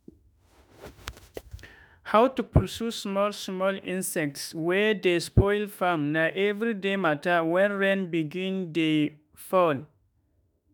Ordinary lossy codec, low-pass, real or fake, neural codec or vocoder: none; none; fake; autoencoder, 48 kHz, 32 numbers a frame, DAC-VAE, trained on Japanese speech